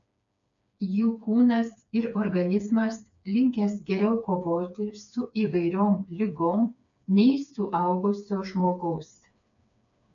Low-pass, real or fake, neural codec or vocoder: 7.2 kHz; fake; codec, 16 kHz, 4 kbps, FreqCodec, smaller model